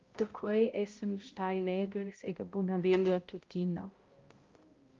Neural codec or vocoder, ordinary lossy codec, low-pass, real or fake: codec, 16 kHz, 0.5 kbps, X-Codec, HuBERT features, trained on balanced general audio; Opus, 32 kbps; 7.2 kHz; fake